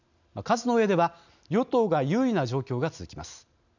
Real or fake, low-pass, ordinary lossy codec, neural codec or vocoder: real; 7.2 kHz; none; none